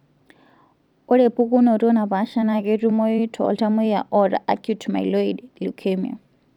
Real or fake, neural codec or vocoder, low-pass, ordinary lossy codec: fake; vocoder, 44.1 kHz, 128 mel bands every 256 samples, BigVGAN v2; 19.8 kHz; none